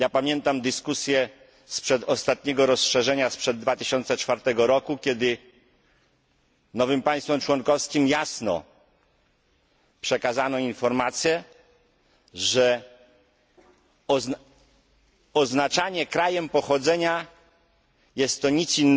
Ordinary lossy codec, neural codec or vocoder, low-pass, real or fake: none; none; none; real